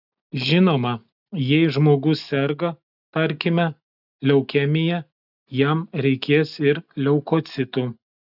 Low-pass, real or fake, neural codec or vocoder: 5.4 kHz; real; none